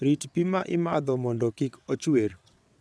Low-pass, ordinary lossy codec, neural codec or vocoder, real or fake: none; none; vocoder, 22.05 kHz, 80 mel bands, WaveNeXt; fake